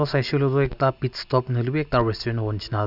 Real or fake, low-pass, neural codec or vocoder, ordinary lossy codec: real; 5.4 kHz; none; none